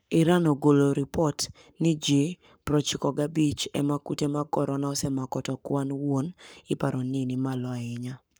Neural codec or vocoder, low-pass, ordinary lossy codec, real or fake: codec, 44.1 kHz, 7.8 kbps, Pupu-Codec; none; none; fake